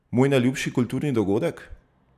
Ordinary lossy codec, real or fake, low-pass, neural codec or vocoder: none; real; 14.4 kHz; none